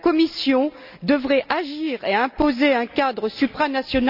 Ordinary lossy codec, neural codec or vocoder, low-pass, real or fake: none; none; 5.4 kHz; real